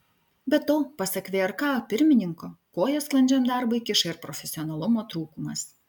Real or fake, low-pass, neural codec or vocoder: real; 19.8 kHz; none